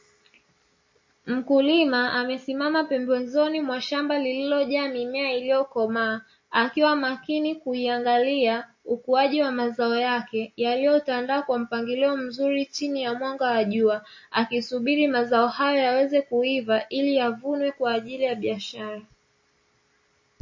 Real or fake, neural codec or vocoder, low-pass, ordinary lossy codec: real; none; 7.2 kHz; MP3, 32 kbps